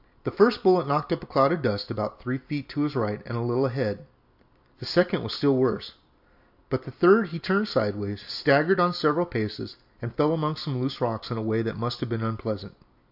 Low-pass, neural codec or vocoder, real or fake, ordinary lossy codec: 5.4 kHz; none; real; AAC, 48 kbps